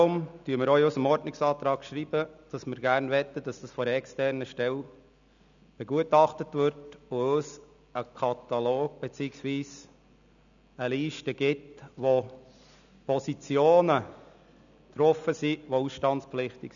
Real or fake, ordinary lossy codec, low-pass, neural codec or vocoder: real; none; 7.2 kHz; none